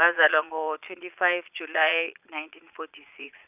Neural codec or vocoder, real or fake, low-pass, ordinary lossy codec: vocoder, 22.05 kHz, 80 mel bands, Vocos; fake; 3.6 kHz; none